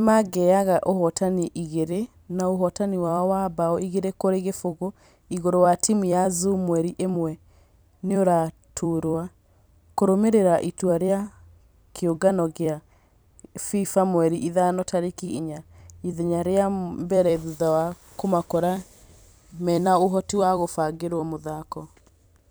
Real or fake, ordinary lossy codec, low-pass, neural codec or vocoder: fake; none; none; vocoder, 44.1 kHz, 128 mel bands every 256 samples, BigVGAN v2